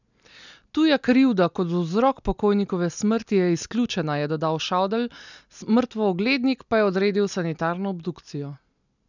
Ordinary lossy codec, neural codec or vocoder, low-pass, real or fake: none; none; 7.2 kHz; real